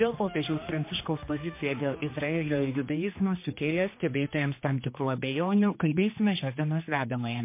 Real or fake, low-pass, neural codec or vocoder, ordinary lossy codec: fake; 3.6 kHz; codec, 16 kHz, 2 kbps, X-Codec, HuBERT features, trained on general audio; MP3, 24 kbps